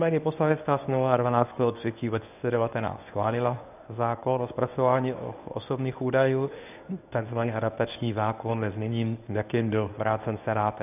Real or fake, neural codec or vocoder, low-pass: fake; codec, 24 kHz, 0.9 kbps, WavTokenizer, medium speech release version 1; 3.6 kHz